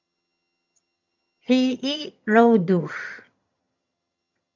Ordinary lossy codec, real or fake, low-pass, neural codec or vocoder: MP3, 64 kbps; fake; 7.2 kHz; vocoder, 22.05 kHz, 80 mel bands, HiFi-GAN